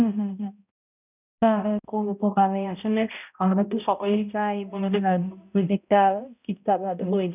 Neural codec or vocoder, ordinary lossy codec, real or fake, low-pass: codec, 16 kHz, 0.5 kbps, X-Codec, HuBERT features, trained on balanced general audio; none; fake; 3.6 kHz